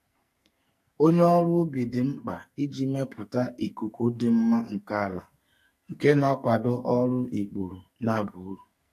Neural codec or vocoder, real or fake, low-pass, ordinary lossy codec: codec, 44.1 kHz, 2.6 kbps, SNAC; fake; 14.4 kHz; AAC, 64 kbps